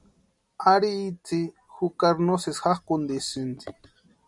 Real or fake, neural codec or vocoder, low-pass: real; none; 10.8 kHz